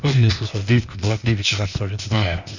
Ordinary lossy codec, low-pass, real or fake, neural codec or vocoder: none; 7.2 kHz; fake; codec, 16 kHz, 0.8 kbps, ZipCodec